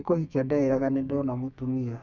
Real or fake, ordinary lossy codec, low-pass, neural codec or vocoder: fake; none; 7.2 kHz; codec, 32 kHz, 1.9 kbps, SNAC